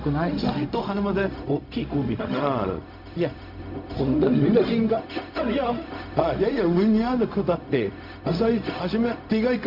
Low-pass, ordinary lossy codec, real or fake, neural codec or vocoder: 5.4 kHz; MP3, 48 kbps; fake; codec, 16 kHz, 0.4 kbps, LongCat-Audio-Codec